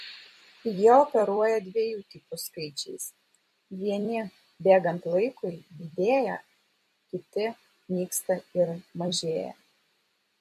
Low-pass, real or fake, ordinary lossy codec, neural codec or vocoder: 14.4 kHz; fake; MP3, 64 kbps; vocoder, 44.1 kHz, 128 mel bands every 256 samples, BigVGAN v2